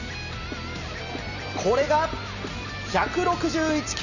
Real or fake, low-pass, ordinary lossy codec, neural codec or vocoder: real; 7.2 kHz; none; none